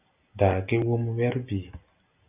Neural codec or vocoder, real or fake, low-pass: none; real; 3.6 kHz